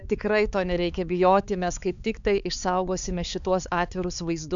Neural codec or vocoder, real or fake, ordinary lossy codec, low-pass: codec, 16 kHz, 4 kbps, X-Codec, HuBERT features, trained on balanced general audio; fake; MP3, 96 kbps; 7.2 kHz